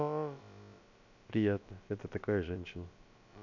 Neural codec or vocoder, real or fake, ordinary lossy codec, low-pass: codec, 16 kHz, about 1 kbps, DyCAST, with the encoder's durations; fake; none; 7.2 kHz